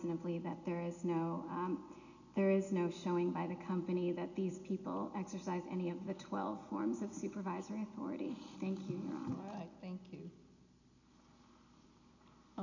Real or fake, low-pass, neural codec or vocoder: real; 7.2 kHz; none